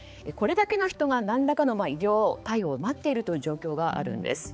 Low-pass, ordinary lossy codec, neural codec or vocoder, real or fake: none; none; codec, 16 kHz, 4 kbps, X-Codec, HuBERT features, trained on balanced general audio; fake